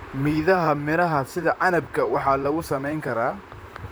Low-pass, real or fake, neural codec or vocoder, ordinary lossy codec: none; fake; vocoder, 44.1 kHz, 128 mel bands, Pupu-Vocoder; none